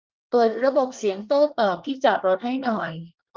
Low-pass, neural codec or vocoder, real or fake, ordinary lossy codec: 7.2 kHz; codec, 24 kHz, 1 kbps, SNAC; fake; Opus, 32 kbps